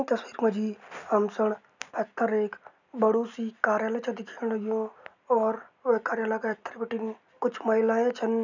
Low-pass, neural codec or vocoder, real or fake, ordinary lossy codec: 7.2 kHz; none; real; none